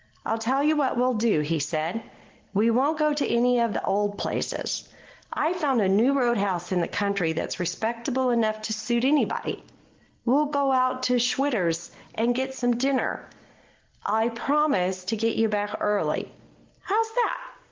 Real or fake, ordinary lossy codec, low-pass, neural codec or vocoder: real; Opus, 16 kbps; 7.2 kHz; none